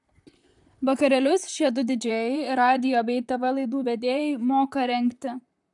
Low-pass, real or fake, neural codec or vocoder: 10.8 kHz; fake; vocoder, 44.1 kHz, 128 mel bands, Pupu-Vocoder